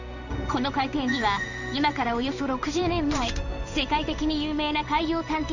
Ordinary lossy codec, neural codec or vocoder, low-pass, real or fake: Opus, 64 kbps; codec, 16 kHz in and 24 kHz out, 1 kbps, XY-Tokenizer; 7.2 kHz; fake